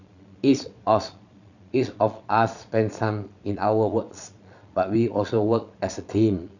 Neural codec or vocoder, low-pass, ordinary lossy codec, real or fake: vocoder, 22.05 kHz, 80 mel bands, Vocos; 7.2 kHz; none; fake